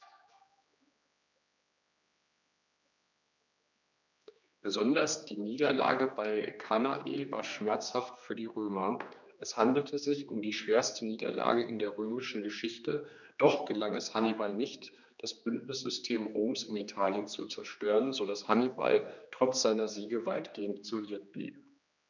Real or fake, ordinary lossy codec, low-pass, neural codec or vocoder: fake; none; 7.2 kHz; codec, 16 kHz, 2 kbps, X-Codec, HuBERT features, trained on general audio